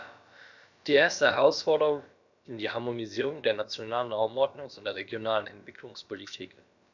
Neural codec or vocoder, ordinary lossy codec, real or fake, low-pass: codec, 16 kHz, about 1 kbps, DyCAST, with the encoder's durations; none; fake; 7.2 kHz